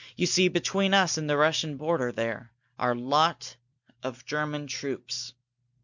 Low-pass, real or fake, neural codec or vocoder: 7.2 kHz; real; none